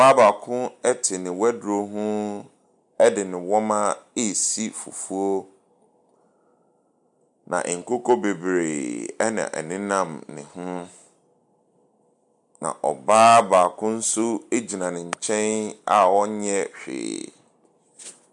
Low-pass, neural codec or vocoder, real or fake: 10.8 kHz; none; real